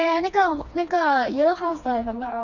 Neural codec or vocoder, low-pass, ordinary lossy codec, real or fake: codec, 16 kHz, 2 kbps, FreqCodec, smaller model; 7.2 kHz; none; fake